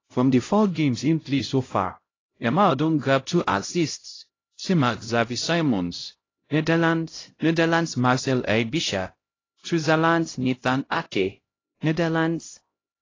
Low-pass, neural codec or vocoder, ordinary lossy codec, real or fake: 7.2 kHz; codec, 16 kHz, 0.5 kbps, X-Codec, HuBERT features, trained on LibriSpeech; AAC, 32 kbps; fake